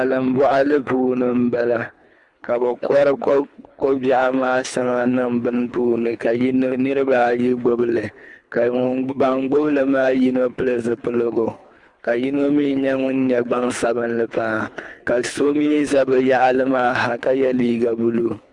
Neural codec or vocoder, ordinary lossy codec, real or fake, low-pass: codec, 24 kHz, 3 kbps, HILCodec; Opus, 64 kbps; fake; 10.8 kHz